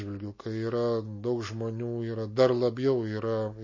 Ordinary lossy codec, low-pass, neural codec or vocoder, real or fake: MP3, 32 kbps; 7.2 kHz; none; real